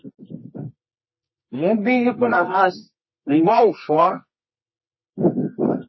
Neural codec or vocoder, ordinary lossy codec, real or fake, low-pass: codec, 24 kHz, 0.9 kbps, WavTokenizer, medium music audio release; MP3, 24 kbps; fake; 7.2 kHz